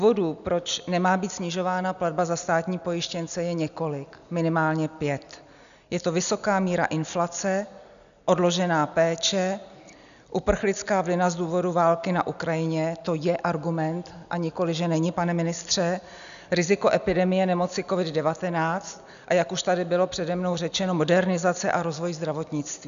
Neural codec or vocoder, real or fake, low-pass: none; real; 7.2 kHz